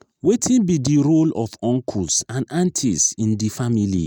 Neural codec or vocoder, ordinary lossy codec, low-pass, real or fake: none; none; none; real